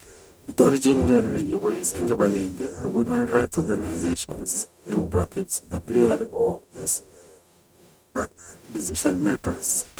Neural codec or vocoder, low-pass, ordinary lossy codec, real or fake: codec, 44.1 kHz, 0.9 kbps, DAC; none; none; fake